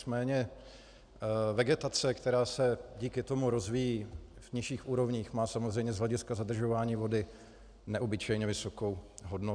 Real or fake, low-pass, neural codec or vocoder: real; 9.9 kHz; none